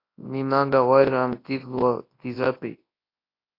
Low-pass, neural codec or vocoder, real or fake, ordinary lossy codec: 5.4 kHz; codec, 24 kHz, 0.9 kbps, WavTokenizer, large speech release; fake; AAC, 32 kbps